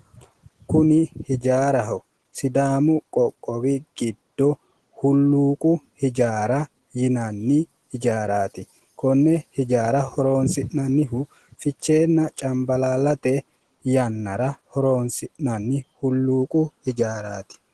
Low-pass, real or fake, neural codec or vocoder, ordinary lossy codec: 10.8 kHz; real; none; Opus, 16 kbps